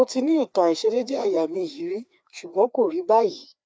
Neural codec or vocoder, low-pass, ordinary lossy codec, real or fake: codec, 16 kHz, 2 kbps, FreqCodec, larger model; none; none; fake